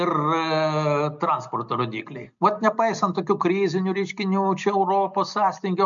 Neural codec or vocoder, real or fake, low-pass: none; real; 7.2 kHz